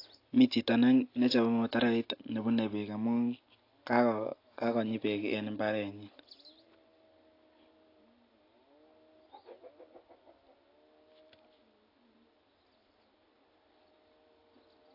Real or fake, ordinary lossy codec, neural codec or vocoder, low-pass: real; AAC, 32 kbps; none; 5.4 kHz